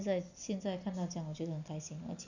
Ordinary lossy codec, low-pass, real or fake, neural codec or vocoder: none; 7.2 kHz; real; none